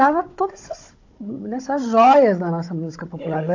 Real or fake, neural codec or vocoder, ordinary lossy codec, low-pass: fake; codec, 16 kHz, 16 kbps, FunCodec, trained on Chinese and English, 50 frames a second; AAC, 48 kbps; 7.2 kHz